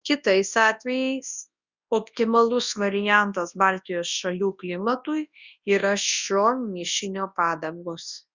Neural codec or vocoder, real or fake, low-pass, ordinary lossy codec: codec, 24 kHz, 0.9 kbps, WavTokenizer, large speech release; fake; 7.2 kHz; Opus, 64 kbps